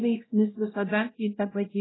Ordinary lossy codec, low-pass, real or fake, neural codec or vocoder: AAC, 16 kbps; 7.2 kHz; fake; codec, 16 kHz, 0.5 kbps, X-Codec, WavLM features, trained on Multilingual LibriSpeech